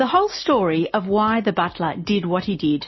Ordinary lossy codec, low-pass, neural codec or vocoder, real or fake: MP3, 24 kbps; 7.2 kHz; none; real